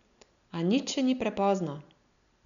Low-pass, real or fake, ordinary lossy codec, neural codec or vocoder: 7.2 kHz; real; none; none